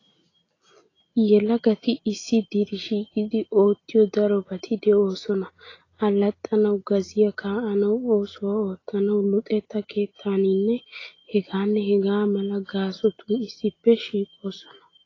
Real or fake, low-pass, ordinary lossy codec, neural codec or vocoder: real; 7.2 kHz; AAC, 32 kbps; none